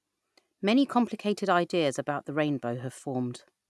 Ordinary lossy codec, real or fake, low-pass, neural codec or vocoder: none; real; none; none